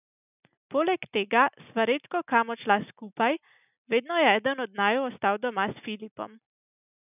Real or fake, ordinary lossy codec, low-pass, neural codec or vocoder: real; none; 3.6 kHz; none